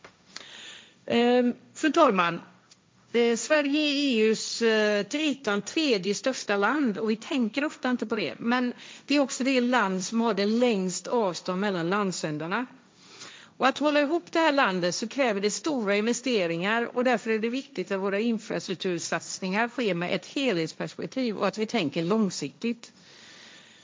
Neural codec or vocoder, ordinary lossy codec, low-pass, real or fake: codec, 16 kHz, 1.1 kbps, Voila-Tokenizer; none; none; fake